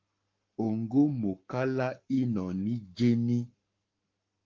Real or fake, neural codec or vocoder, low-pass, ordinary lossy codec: fake; codec, 44.1 kHz, 7.8 kbps, Pupu-Codec; 7.2 kHz; Opus, 32 kbps